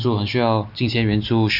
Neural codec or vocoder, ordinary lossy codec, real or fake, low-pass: none; none; real; 5.4 kHz